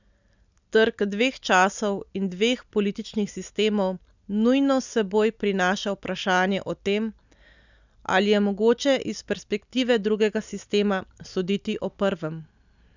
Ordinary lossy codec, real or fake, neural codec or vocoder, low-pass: none; real; none; 7.2 kHz